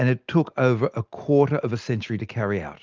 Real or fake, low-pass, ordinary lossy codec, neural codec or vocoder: real; 7.2 kHz; Opus, 32 kbps; none